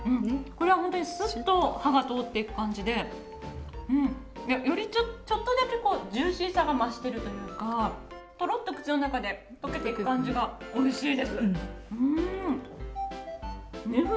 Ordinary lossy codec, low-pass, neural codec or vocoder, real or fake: none; none; none; real